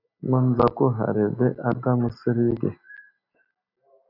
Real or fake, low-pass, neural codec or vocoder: real; 5.4 kHz; none